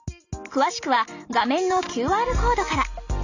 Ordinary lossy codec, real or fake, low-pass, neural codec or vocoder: none; real; 7.2 kHz; none